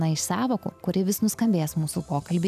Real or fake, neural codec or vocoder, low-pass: real; none; 14.4 kHz